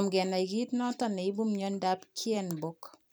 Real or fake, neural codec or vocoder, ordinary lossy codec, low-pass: fake; vocoder, 44.1 kHz, 128 mel bands every 256 samples, BigVGAN v2; none; none